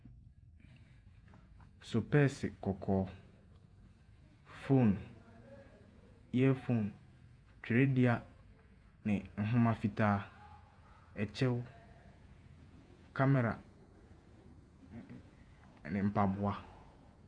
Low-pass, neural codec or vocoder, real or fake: 9.9 kHz; vocoder, 48 kHz, 128 mel bands, Vocos; fake